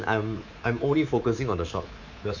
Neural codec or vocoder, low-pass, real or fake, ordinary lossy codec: codec, 24 kHz, 3.1 kbps, DualCodec; 7.2 kHz; fake; none